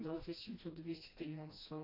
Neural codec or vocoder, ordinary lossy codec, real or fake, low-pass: codec, 16 kHz, 1 kbps, FreqCodec, smaller model; AAC, 48 kbps; fake; 5.4 kHz